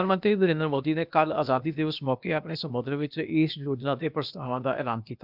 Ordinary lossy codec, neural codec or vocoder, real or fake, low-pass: none; codec, 16 kHz, 0.8 kbps, ZipCodec; fake; 5.4 kHz